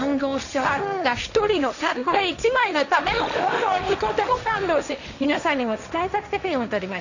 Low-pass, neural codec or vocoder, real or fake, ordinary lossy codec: 7.2 kHz; codec, 16 kHz, 1.1 kbps, Voila-Tokenizer; fake; none